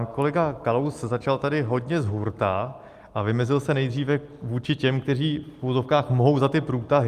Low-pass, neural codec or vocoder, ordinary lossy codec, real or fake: 14.4 kHz; none; Opus, 32 kbps; real